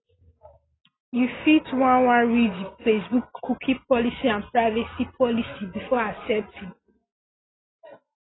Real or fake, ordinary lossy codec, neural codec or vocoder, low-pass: real; AAC, 16 kbps; none; 7.2 kHz